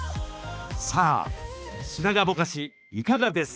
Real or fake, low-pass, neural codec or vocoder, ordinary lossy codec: fake; none; codec, 16 kHz, 2 kbps, X-Codec, HuBERT features, trained on balanced general audio; none